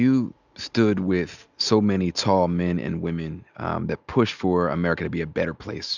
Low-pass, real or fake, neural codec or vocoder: 7.2 kHz; real; none